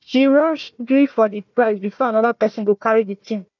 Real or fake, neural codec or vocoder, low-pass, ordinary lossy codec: fake; codec, 16 kHz, 1 kbps, FunCodec, trained on Chinese and English, 50 frames a second; 7.2 kHz; none